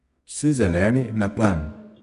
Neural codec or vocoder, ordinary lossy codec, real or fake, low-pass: codec, 24 kHz, 0.9 kbps, WavTokenizer, medium music audio release; none; fake; 10.8 kHz